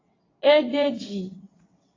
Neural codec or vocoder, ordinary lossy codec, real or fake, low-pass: vocoder, 22.05 kHz, 80 mel bands, WaveNeXt; AAC, 32 kbps; fake; 7.2 kHz